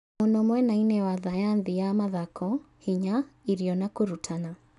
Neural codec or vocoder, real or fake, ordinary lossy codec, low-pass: none; real; AAC, 96 kbps; 10.8 kHz